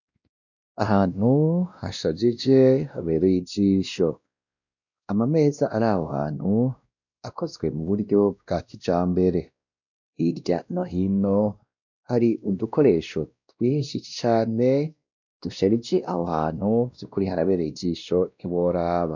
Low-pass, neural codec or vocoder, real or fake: 7.2 kHz; codec, 16 kHz, 1 kbps, X-Codec, WavLM features, trained on Multilingual LibriSpeech; fake